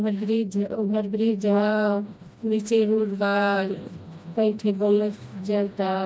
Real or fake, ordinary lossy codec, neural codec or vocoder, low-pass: fake; none; codec, 16 kHz, 1 kbps, FreqCodec, smaller model; none